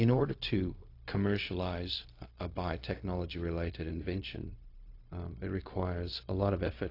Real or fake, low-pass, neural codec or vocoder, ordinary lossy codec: fake; 5.4 kHz; codec, 16 kHz, 0.4 kbps, LongCat-Audio-Codec; AAC, 32 kbps